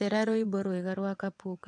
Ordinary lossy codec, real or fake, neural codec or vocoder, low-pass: AAC, 64 kbps; fake; vocoder, 22.05 kHz, 80 mel bands, WaveNeXt; 9.9 kHz